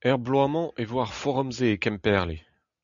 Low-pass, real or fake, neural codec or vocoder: 7.2 kHz; real; none